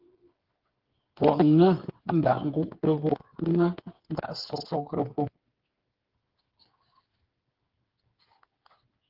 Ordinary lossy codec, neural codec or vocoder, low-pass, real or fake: Opus, 16 kbps; codec, 16 kHz, 8 kbps, FreqCodec, smaller model; 5.4 kHz; fake